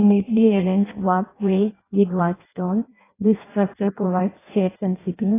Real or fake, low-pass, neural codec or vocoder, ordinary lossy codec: fake; 3.6 kHz; codec, 16 kHz in and 24 kHz out, 0.6 kbps, FireRedTTS-2 codec; AAC, 16 kbps